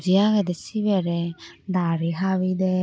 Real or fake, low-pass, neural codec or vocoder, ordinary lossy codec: real; none; none; none